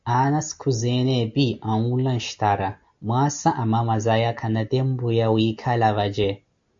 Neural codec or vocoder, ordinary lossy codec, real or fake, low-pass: none; AAC, 64 kbps; real; 7.2 kHz